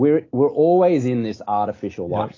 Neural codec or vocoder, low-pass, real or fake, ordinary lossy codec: none; 7.2 kHz; real; AAC, 32 kbps